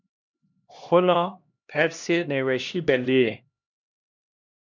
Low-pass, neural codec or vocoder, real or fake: 7.2 kHz; codec, 16 kHz, 1 kbps, X-Codec, HuBERT features, trained on LibriSpeech; fake